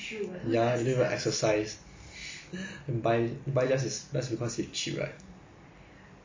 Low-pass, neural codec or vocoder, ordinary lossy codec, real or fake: 7.2 kHz; none; MP3, 32 kbps; real